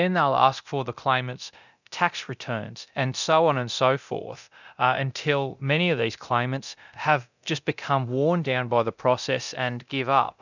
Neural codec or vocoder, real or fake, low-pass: codec, 24 kHz, 0.9 kbps, DualCodec; fake; 7.2 kHz